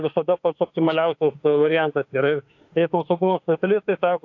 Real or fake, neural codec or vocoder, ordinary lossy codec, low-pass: fake; autoencoder, 48 kHz, 32 numbers a frame, DAC-VAE, trained on Japanese speech; AAC, 48 kbps; 7.2 kHz